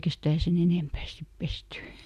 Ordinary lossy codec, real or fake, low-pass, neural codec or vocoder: none; real; 14.4 kHz; none